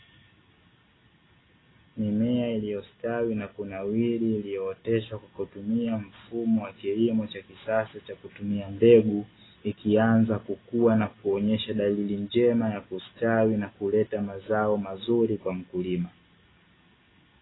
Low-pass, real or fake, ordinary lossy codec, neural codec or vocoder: 7.2 kHz; real; AAC, 16 kbps; none